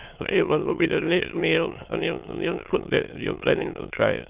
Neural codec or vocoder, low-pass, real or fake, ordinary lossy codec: autoencoder, 22.05 kHz, a latent of 192 numbers a frame, VITS, trained on many speakers; 3.6 kHz; fake; Opus, 32 kbps